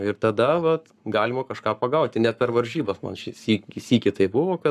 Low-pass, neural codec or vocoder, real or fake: 14.4 kHz; codec, 44.1 kHz, 7.8 kbps, Pupu-Codec; fake